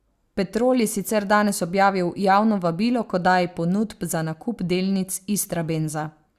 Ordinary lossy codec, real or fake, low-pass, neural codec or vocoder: Opus, 64 kbps; real; 14.4 kHz; none